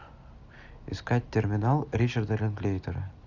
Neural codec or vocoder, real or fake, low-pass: none; real; 7.2 kHz